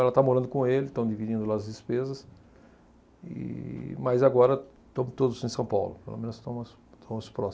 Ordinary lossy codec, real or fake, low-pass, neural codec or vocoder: none; real; none; none